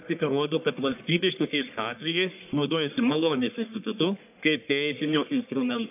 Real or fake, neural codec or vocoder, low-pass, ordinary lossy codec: fake; codec, 44.1 kHz, 1.7 kbps, Pupu-Codec; 3.6 kHz; AAC, 32 kbps